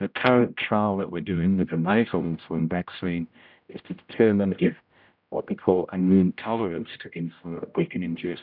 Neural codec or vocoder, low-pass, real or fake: codec, 16 kHz, 0.5 kbps, X-Codec, HuBERT features, trained on general audio; 5.4 kHz; fake